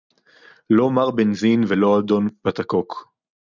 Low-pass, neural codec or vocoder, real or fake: 7.2 kHz; none; real